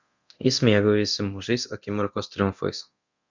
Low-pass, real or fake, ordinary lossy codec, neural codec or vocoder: 7.2 kHz; fake; Opus, 64 kbps; codec, 24 kHz, 0.9 kbps, DualCodec